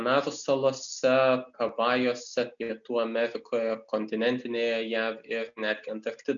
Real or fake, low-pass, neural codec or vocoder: real; 7.2 kHz; none